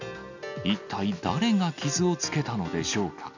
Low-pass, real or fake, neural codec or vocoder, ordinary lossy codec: 7.2 kHz; real; none; none